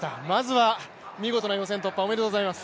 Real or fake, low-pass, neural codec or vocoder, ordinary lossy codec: real; none; none; none